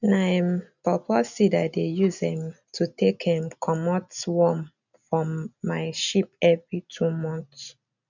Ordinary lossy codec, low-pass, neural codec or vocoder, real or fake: none; 7.2 kHz; none; real